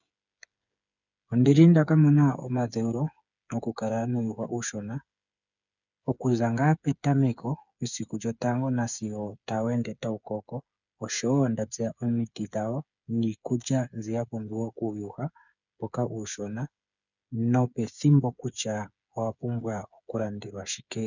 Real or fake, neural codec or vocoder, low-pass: fake; codec, 16 kHz, 8 kbps, FreqCodec, smaller model; 7.2 kHz